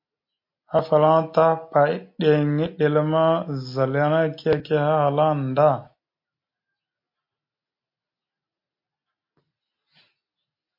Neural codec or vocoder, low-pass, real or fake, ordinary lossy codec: none; 5.4 kHz; real; MP3, 32 kbps